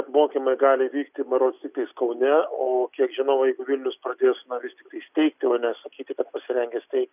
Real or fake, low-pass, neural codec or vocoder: real; 3.6 kHz; none